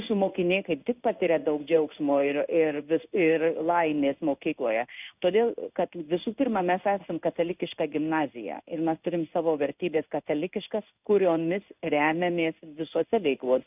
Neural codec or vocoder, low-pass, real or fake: codec, 16 kHz in and 24 kHz out, 1 kbps, XY-Tokenizer; 3.6 kHz; fake